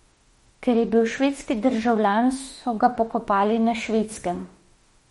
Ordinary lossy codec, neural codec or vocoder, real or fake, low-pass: MP3, 48 kbps; autoencoder, 48 kHz, 32 numbers a frame, DAC-VAE, trained on Japanese speech; fake; 19.8 kHz